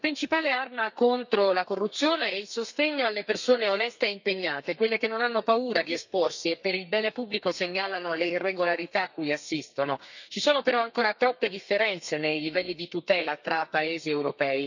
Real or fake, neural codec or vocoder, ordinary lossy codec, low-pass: fake; codec, 32 kHz, 1.9 kbps, SNAC; none; 7.2 kHz